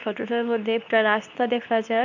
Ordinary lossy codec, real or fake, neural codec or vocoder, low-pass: none; fake; codec, 24 kHz, 0.9 kbps, WavTokenizer, medium speech release version 2; 7.2 kHz